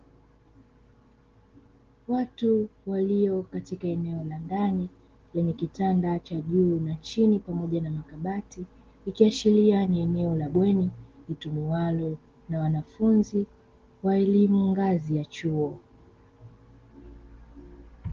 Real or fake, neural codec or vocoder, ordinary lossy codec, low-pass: real; none; Opus, 16 kbps; 7.2 kHz